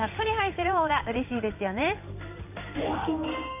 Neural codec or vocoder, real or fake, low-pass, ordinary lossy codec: codec, 16 kHz in and 24 kHz out, 1 kbps, XY-Tokenizer; fake; 3.6 kHz; none